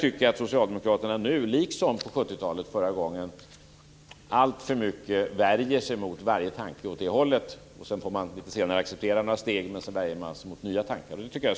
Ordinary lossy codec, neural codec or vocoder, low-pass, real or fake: none; none; none; real